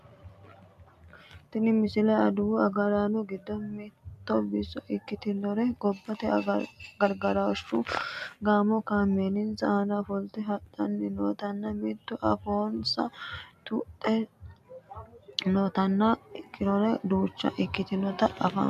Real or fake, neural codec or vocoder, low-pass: real; none; 14.4 kHz